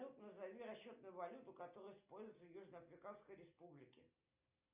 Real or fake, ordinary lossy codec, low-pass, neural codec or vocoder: real; Opus, 64 kbps; 3.6 kHz; none